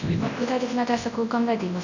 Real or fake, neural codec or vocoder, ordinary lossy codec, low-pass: fake; codec, 24 kHz, 0.9 kbps, WavTokenizer, large speech release; none; 7.2 kHz